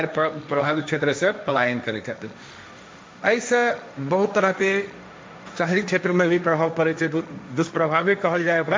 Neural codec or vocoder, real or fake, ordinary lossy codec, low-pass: codec, 16 kHz, 1.1 kbps, Voila-Tokenizer; fake; none; none